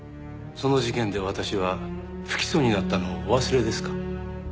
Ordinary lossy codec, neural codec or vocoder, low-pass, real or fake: none; none; none; real